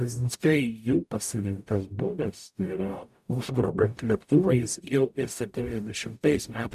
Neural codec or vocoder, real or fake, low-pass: codec, 44.1 kHz, 0.9 kbps, DAC; fake; 14.4 kHz